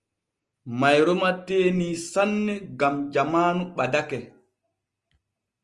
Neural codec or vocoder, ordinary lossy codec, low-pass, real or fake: none; Opus, 24 kbps; 10.8 kHz; real